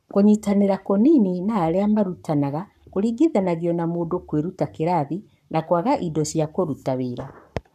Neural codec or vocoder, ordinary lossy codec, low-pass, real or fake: codec, 44.1 kHz, 7.8 kbps, Pupu-Codec; none; 14.4 kHz; fake